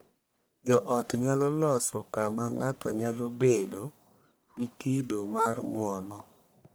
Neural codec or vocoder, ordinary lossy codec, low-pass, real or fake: codec, 44.1 kHz, 1.7 kbps, Pupu-Codec; none; none; fake